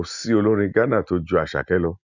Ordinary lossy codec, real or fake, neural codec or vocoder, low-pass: none; real; none; 7.2 kHz